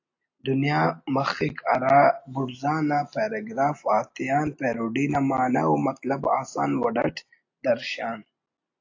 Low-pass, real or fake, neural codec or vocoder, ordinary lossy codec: 7.2 kHz; real; none; AAC, 48 kbps